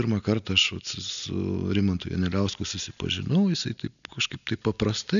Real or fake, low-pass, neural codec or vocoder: real; 7.2 kHz; none